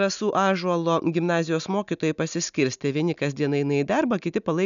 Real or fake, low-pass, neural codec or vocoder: real; 7.2 kHz; none